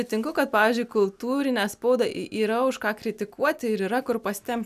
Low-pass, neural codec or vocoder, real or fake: 14.4 kHz; none; real